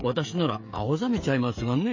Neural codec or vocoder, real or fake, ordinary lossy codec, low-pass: codec, 16 kHz, 16 kbps, FreqCodec, smaller model; fake; MP3, 32 kbps; 7.2 kHz